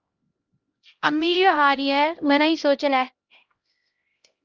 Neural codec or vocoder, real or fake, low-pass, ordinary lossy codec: codec, 16 kHz, 0.5 kbps, X-Codec, HuBERT features, trained on LibriSpeech; fake; 7.2 kHz; Opus, 24 kbps